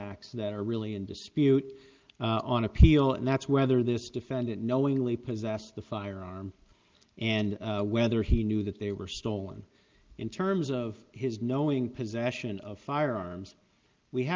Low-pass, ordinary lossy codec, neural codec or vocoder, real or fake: 7.2 kHz; Opus, 32 kbps; none; real